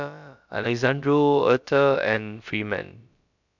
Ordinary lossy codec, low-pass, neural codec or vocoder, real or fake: none; 7.2 kHz; codec, 16 kHz, about 1 kbps, DyCAST, with the encoder's durations; fake